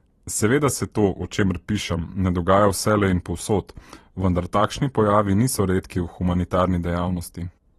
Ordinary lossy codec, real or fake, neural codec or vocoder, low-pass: AAC, 32 kbps; fake; vocoder, 44.1 kHz, 128 mel bands every 512 samples, BigVGAN v2; 19.8 kHz